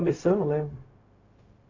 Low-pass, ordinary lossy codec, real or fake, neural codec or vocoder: 7.2 kHz; AAC, 32 kbps; fake; codec, 16 kHz, 0.4 kbps, LongCat-Audio-Codec